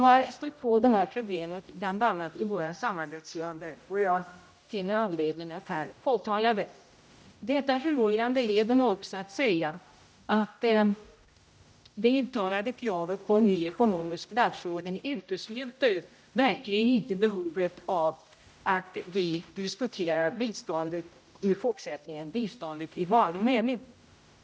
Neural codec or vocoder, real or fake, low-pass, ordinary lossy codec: codec, 16 kHz, 0.5 kbps, X-Codec, HuBERT features, trained on general audio; fake; none; none